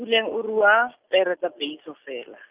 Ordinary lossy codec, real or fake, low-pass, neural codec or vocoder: Opus, 24 kbps; real; 3.6 kHz; none